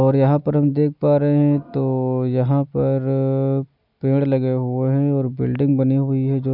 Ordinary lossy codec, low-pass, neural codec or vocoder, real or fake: none; 5.4 kHz; none; real